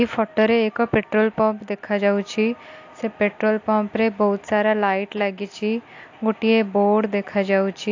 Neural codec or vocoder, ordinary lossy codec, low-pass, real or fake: none; AAC, 48 kbps; 7.2 kHz; real